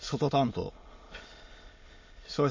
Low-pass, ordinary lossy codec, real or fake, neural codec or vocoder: 7.2 kHz; MP3, 32 kbps; fake; autoencoder, 22.05 kHz, a latent of 192 numbers a frame, VITS, trained on many speakers